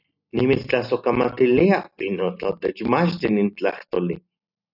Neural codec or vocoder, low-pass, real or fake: none; 5.4 kHz; real